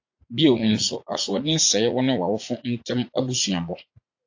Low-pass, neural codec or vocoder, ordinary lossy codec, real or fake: 7.2 kHz; vocoder, 44.1 kHz, 80 mel bands, Vocos; AAC, 48 kbps; fake